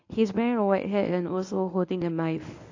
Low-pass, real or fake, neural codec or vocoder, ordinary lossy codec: 7.2 kHz; fake; codec, 24 kHz, 0.9 kbps, WavTokenizer, medium speech release version 1; AAC, 48 kbps